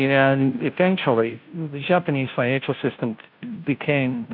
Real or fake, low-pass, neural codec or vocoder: fake; 5.4 kHz; codec, 16 kHz, 0.5 kbps, FunCodec, trained on Chinese and English, 25 frames a second